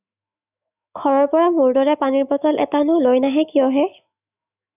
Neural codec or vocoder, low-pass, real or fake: autoencoder, 48 kHz, 128 numbers a frame, DAC-VAE, trained on Japanese speech; 3.6 kHz; fake